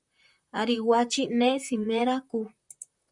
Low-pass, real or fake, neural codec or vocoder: 10.8 kHz; fake; vocoder, 44.1 kHz, 128 mel bands, Pupu-Vocoder